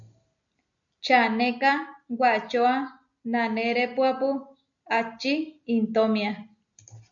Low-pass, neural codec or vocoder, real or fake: 7.2 kHz; none; real